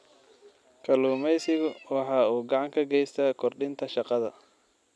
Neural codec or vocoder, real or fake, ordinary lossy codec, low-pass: none; real; none; none